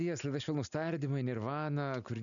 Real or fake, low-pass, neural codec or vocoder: real; 7.2 kHz; none